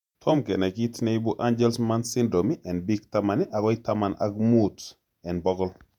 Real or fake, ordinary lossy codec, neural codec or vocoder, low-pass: fake; none; vocoder, 48 kHz, 128 mel bands, Vocos; 19.8 kHz